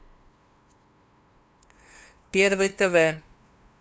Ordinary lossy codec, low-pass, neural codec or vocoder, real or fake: none; none; codec, 16 kHz, 2 kbps, FunCodec, trained on LibriTTS, 25 frames a second; fake